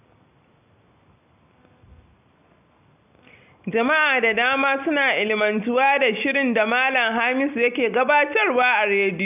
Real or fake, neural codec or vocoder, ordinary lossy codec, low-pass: real; none; none; 3.6 kHz